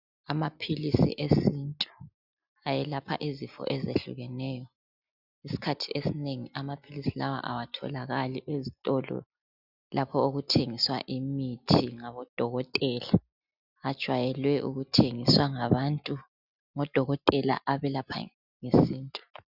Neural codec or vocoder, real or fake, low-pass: none; real; 5.4 kHz